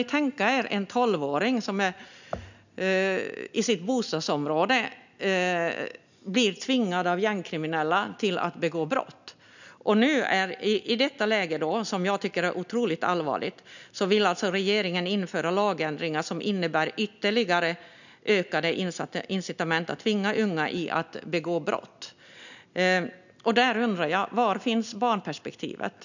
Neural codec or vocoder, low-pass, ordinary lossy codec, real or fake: none; 7.2 kHz; none; real